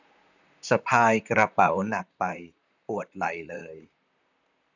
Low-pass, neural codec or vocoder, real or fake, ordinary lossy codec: 7.2 kHz; vocoder, 44.1 kHz, 128 mel bands, Pupu-Vocoder; fake; none